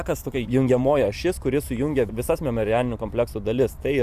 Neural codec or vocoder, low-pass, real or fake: vocoder, 44.1 kHz, 128 mel bands every 512 samples, BigVGAN v2; 14.4 kHz; fake